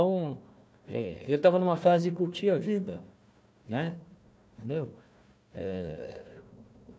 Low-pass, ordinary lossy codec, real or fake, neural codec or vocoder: none; none; fake; codec, 16 kHz, 1 kbps, FunCodec, trained on Chinese and English, 50 frames a second